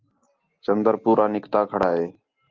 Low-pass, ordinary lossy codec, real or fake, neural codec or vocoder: 7.2 kHz; Opus, 32 kbps; real; none